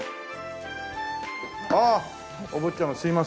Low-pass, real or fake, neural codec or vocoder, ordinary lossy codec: none; real; none; none